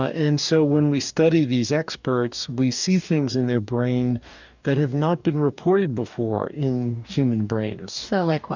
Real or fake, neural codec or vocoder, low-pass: fake; codec, 44.1 kHz, 2.6 kbps, DAC; 7.2 kHz